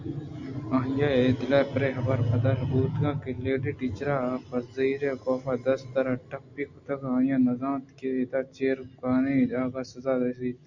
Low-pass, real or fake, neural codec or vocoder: 7.2 kHz; real; none